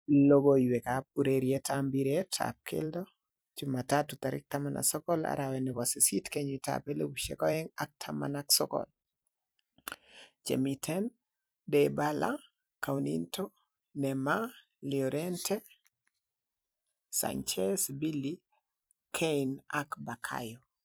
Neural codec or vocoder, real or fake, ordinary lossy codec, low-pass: vocoder, 44.1 kHz, 128 mel bands every 256 samples, BigVGAN v2; fake; none; none